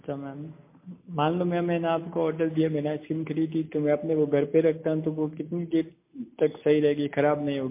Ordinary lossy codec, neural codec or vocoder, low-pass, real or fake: MP3, 24 kbps; none; 3.6 kHz; real